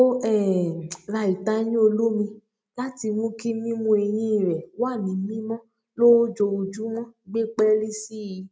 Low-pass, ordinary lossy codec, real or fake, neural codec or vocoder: none; none; real; none